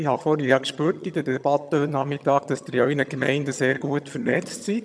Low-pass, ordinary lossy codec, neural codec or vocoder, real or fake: none; none; vocoder, 22.05 kHz, 80 mel bands, HiFi-GAN; fake